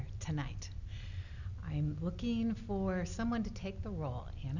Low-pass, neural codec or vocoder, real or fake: 7.2 kHz; none; real